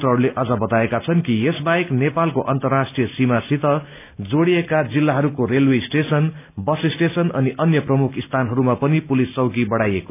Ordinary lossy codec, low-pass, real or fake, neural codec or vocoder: none; 3.6 kHz; real; none